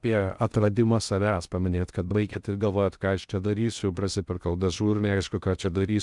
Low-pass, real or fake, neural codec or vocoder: 10.8 kHz; fake; codec, 16 kHz in and 24 kHz out, 0.6 kbps, FocalCodec, streaming, 2048 codes